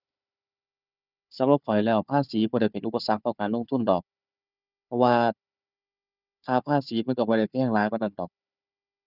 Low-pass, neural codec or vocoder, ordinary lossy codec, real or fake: 5.4 kHz; codec, 16 kHz, 4 kbps, FunCodec, trained on Chinese and English, 50 frames a second; none; fake